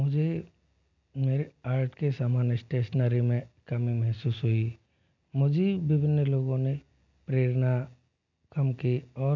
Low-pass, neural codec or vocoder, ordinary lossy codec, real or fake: 7.2 kHz; none; none; real